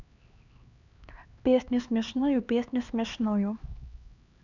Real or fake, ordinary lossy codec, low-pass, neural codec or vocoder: fake; none; 7.2 kHz; codec, 16 kHz, 2 kbps, X-Codec, HuBERT features, trained on LibriSpeech